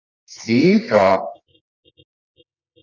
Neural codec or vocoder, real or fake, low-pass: codec, 24 kHz, 0.9 kbps, WavTokenizer, medium music audio release; fake; 7.2 kHz